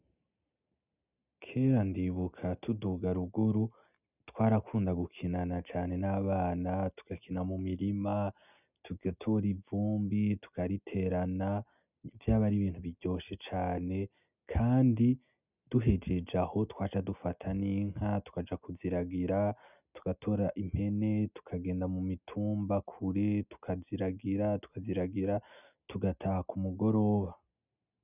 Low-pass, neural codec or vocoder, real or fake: 3.6 kHz; none; real